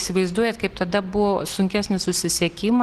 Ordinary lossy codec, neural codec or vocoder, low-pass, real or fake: Opus, 16 kbps; none; 14.4 kHz; real